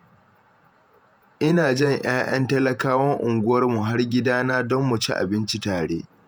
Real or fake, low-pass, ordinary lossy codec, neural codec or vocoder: fake; none; none; vocoder, 48 kHz, 128 mel bands, Vocos